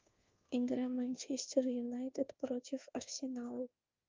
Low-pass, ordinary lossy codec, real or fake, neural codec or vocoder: 7.2 kHz; Opus, 24 kbps; fake; codec, 24 kHz, 1.2 kbps, DualCodec